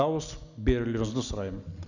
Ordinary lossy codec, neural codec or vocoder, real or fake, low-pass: none; none; real; 7.2 kHz